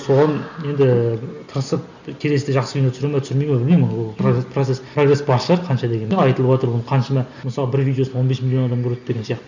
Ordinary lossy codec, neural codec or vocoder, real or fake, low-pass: none; none; real; 7.2 kHz